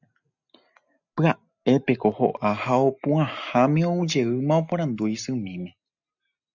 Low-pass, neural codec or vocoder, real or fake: 7.2 kHz; none; real